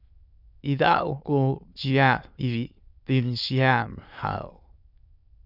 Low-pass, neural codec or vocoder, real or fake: 5.4 kHz; autoencoder, 22.05 kHz, a latent of 192 numbers a frame, VITS, trained on many speakers; fake